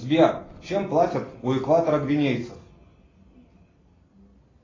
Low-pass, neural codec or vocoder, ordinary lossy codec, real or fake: 7.2 kHz; none; AAC, 32 kbps; real